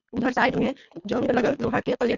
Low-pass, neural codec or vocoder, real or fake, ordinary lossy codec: 7.2 kHz; codec, 24 kHz, 3 kbps, HILCodec; fake; none